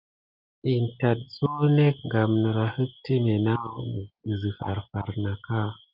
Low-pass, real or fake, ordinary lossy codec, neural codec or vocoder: 5.4 kHz; real; Opus, 32 kbps; none